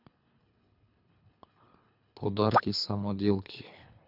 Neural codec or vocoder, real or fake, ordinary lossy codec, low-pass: codec, 24 kHz, 3 kbps, HILCodec; fake; none; 5.4 kHz